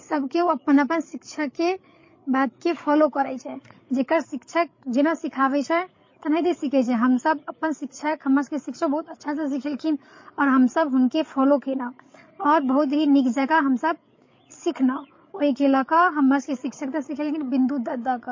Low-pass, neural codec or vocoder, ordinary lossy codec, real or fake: 7.2 kHz; codec, 16 kHz, 8 kbps, FreqCodec, larger model; MP3, 32 kbps; fake